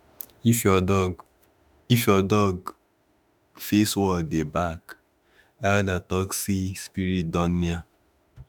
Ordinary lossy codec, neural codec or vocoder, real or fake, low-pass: none; autoencoder, 48 kHz, 32 numbers a frame, DAC-VAE, trained on Japanese speech; fake; none